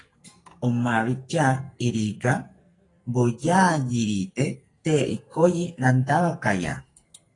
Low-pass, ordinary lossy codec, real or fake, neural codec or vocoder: 10.8 kHz; AAC, 32 kbps; fake; codec, 44.1 kHz, 2.6 kbps, SNAC